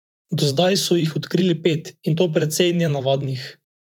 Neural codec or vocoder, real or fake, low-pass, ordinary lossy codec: vocoder, 44.1 kHz, 128 mel bands, Pupu-Vocoder; fake; 19.8 kHz; none